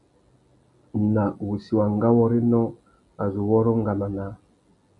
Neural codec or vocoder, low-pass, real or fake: none; 10.8 kHz; real